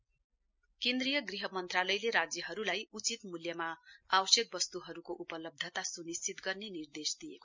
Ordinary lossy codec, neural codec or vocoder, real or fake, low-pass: MP3, 64 kbps; none; real; 7.2 kHz